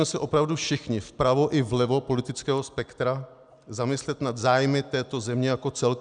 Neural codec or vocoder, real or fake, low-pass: none; real; 9.9 kHz